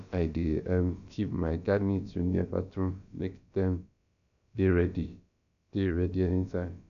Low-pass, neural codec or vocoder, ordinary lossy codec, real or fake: 7.2 kHz; codec, 16 kHz, about 1 kbps, DyCAST, with the encoder's durations; none; fake